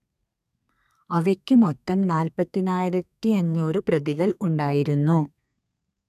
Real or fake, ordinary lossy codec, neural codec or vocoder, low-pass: fake; none; codec, 32 kHz, 1.9 kbps, SNAC; 14.4 kHz